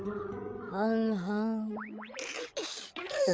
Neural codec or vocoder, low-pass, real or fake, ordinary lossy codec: codec, 16 kHz, 8 kbps, FreqCodec, larger model; none; fake; none